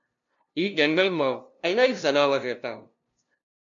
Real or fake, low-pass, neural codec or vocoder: fake; 7.2 kHz; codec, 16 kHz, 0.5 kbps, FunCodec, trained on LibriTTS, 25 frames a second